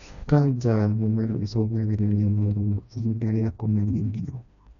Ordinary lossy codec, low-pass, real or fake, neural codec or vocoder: none; 7.2 kHz; fake; codec, 16 kHz, 1 kbps, FreqCodec, smaller model